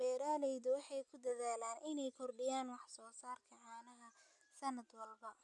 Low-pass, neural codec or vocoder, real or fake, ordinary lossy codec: 10.8 kHz; none; real; none